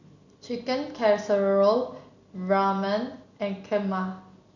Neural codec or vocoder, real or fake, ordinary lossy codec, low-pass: none; real; none; 7.2 kHz